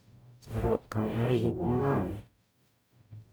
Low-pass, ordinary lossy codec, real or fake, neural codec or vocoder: none; none; fake; codec, 44.1 kHz, 0.9 kbps, DAC